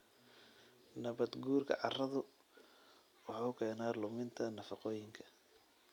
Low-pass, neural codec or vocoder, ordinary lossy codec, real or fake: 19.8 kHz; none; none; real